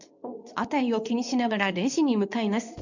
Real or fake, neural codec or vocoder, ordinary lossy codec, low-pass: fake; codec, 24 kHz, 0.9 kbps, WavTokenizer, medium speech release version 2; none; 7.2 kHz